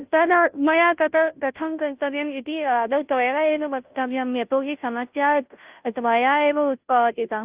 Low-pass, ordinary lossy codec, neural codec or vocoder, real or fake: 3.6 kHz; Opus, 32 kbps; codec, 16 kHz, 0.5 kbps, FunCodec, trained on Chinese and English, 25 frames a second; fake